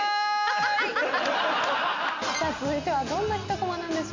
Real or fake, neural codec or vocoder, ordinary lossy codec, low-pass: real; none; MP3, 64 kbps; 7.2 kHz